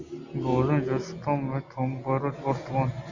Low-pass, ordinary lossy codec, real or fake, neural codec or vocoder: 7.2 kHz; Opus, 64 kbps; real; none